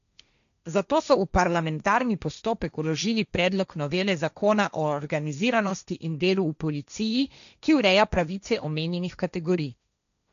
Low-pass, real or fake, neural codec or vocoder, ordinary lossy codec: 7.2 kHz; fake; codec, 16 kHz, 1.1 kbps, Voila-Tokenizer; none